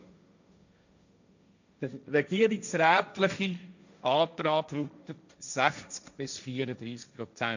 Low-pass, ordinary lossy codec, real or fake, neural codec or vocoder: 7.2 kHz; none; fake; codec, 16 kHz, 1.1 kbps, Voila-Tokenizer